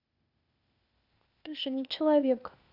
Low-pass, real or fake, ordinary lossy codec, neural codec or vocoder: 5.4 kHz; fake; none; codec, 16 kHz, 0.8 kbps, ZipCodec